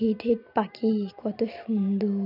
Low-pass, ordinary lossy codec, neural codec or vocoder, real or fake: 5.4 kHz; none; none; real